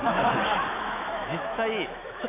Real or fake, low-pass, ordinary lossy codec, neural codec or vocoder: fake; 3.6 kHz; none; autoencoder, 48 kHz, 128 numbers a frame, DAC-VAE, trained on Japanese speech